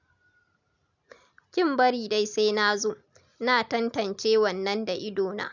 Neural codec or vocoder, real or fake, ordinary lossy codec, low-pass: none; real; none; 7.2 kHz